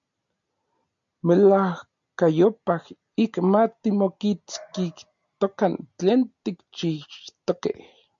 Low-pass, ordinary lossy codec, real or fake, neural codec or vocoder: 7.2 kHz; MP3, 96 kbps; real; none